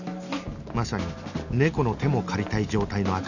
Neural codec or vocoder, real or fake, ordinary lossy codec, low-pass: none; real; none; 7.2 kHz